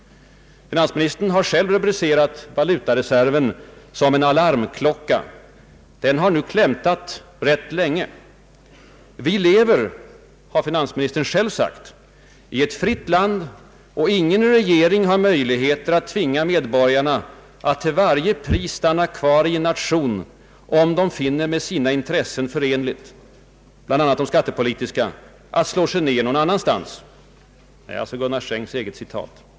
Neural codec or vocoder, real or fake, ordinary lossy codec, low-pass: none; real; none; none